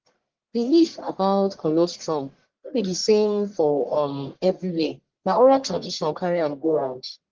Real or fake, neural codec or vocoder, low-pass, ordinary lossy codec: fake; codec, 44.1 kHz, 1.7 kbps, Pupu-Codec; 7.2 kHz; Opus, 16 kbps